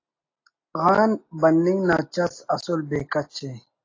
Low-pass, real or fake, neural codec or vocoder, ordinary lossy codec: 7.2 kHz; real; none; AAC, 32 kbps